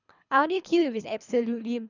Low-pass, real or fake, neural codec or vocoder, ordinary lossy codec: 7.2 kHz; fake; codec, 24 kHz, 3 kbps, HILCodec; none